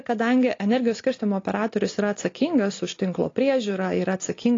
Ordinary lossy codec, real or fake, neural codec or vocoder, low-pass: AAC, 32 kbps; real; none; 7.2 kHz